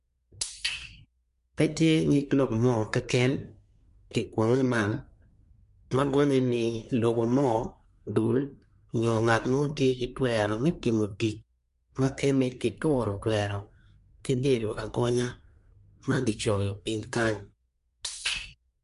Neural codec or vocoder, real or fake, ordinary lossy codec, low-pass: codec, 24 kHz, 1 kbps, SNAC; fake; MP3, 64 kbps; 10.8 kHz